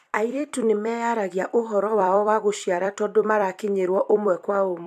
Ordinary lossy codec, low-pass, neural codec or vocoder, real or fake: AAC, 96 kbps; 14.4 kHz; vocoder, 44.1 kHz, 128 mel bands every 256 samples, BigVGAN v2; fake